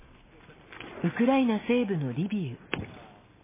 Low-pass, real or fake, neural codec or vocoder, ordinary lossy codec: 3.6 kHz; real; none; MP3, 16 kbps